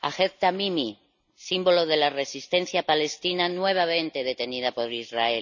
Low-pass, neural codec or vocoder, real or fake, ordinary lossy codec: 7.2 kHz; none; real; none